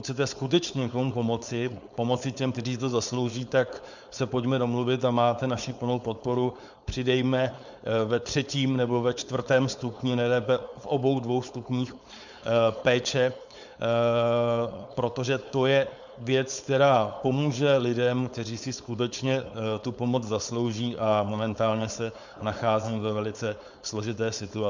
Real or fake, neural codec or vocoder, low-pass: fake; codec, 16 kHz, 4.8 kbps, FACodec; 7.2 kHz